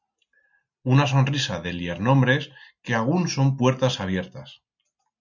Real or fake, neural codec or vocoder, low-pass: real; none; 7.2 kHz